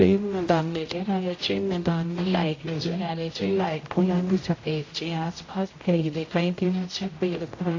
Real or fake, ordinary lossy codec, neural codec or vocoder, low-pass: fake; AAC, 32 kbps; codec, 16 kHz, 0.5 kbps, X-Codec, HuBERT features, trained on general audio; 7.2 kHz